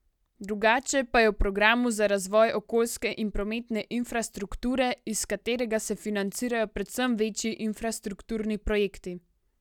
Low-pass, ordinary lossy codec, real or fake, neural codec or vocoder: 19.8 kHz; none; real; none